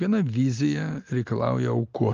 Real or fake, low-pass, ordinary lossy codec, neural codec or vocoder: real; 7.2 kHz; Opus, 24 kbps; none